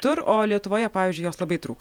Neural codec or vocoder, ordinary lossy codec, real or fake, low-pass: vocoder, 48 kHz, 128 mel bands, Vocos; MP3, 96 kbps; fake; 19.8 kHz